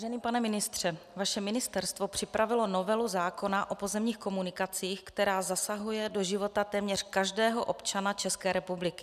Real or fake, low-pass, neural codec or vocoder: real; 14.4 kHz; none